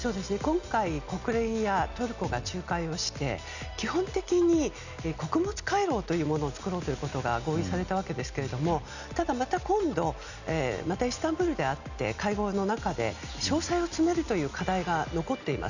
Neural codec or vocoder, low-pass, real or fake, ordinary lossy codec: none; 7.2 kHz; real; none